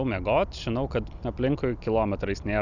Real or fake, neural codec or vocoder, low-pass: real; none; 7.2 kHz